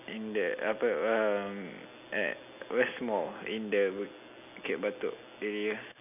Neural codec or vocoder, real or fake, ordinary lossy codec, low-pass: none; real; none; 3.6 kHz